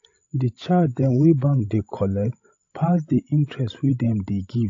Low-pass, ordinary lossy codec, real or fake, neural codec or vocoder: 7.2 kHz; AAC, 48 kbps; fake; codec, 16 kHz, 16 kbps, FreqCodec, larger model